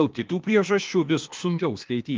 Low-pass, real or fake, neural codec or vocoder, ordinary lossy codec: 7.2 kHz; fake; codec, 16 kHz, 0.8 kbps, ZipCodec; Opus, 24 kbps